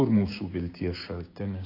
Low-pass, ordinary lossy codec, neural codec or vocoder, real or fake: 5.4 kHz; AAC, 32 kbps; codec, 16 kHz, 6 kbps, DAC; fake